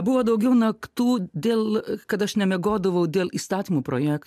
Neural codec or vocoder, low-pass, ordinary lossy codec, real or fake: none; 14.4 kHz; MP3, 96 kbps; real